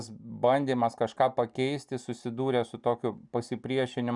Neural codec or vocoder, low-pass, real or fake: none; 10.8 kHz; real